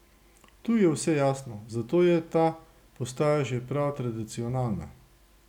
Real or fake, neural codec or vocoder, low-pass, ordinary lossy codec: real; none; 19.8 kHz; none